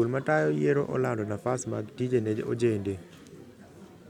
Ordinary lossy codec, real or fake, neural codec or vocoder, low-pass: none; real; none; 19.8 kHz